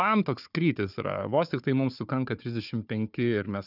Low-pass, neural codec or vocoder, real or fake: 5.4 kHz; codec, 16 kHz, 4 kbps, FunCodec, trained on Chinese and English, 50 frames a second; fake